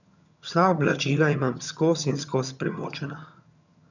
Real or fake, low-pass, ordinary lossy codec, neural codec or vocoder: fake; 7.2 kHz; none; vocoder, 22.05 kHz, 80 mel bands, HiFi-GAN